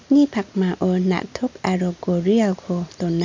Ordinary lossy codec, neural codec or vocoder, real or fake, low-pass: MP3, 64 kbps; none; real; 7.2 kHz